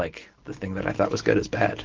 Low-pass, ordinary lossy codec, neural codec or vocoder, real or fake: 7.2 kHz; Opus, 16 kbps; none; real